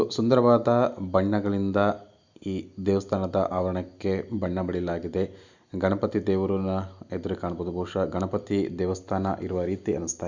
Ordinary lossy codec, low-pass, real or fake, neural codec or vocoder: Opus, 64 kbps; 7.2 kHz; real; none